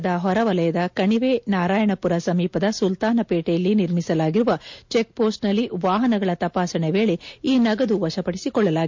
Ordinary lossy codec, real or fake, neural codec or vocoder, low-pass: MP3, 48 kbps; real; none; 7.2 kHz